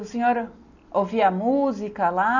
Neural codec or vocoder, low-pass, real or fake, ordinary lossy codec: none; 7.2 kHz; real; none